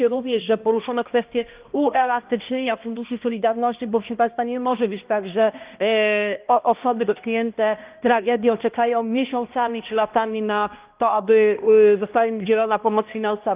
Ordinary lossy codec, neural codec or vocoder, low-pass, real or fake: Opus, 32 kbps; codec, 16 kHz, 1 kbps, X-Codec, HuBERT features, trained on balanced general audio; 3.6 kHz; fake